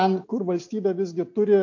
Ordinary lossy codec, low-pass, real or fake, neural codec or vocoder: AAC, 48 kbps; 7.2 kHz; real; none